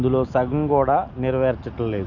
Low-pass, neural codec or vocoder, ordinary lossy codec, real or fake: 7.2 kHz; none; none; real